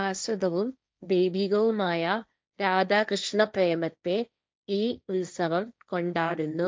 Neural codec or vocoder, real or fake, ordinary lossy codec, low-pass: codec, 16 kHz, 1.1 kbps, Voila-Tokenizer; fake; none; none